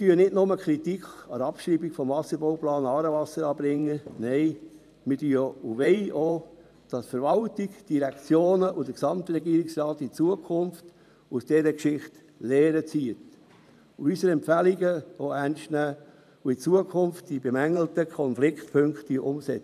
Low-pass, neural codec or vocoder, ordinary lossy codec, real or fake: 14.4 kHz; vocoder, 44.1 kHz, 128 mel bands every 512 samples, BigVGAN v2; none; fake